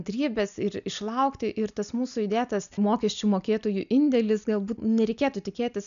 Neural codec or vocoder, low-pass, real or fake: none; 7.2 kHz; real